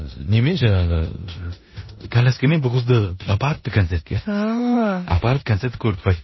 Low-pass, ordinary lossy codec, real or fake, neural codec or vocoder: 7.2 kHz; MP3, 24 kbps; fake; codec, 16 kHz in and 24 kHz out, 0.9 kbps, LongCat-Audio-Codec, four codebook decoder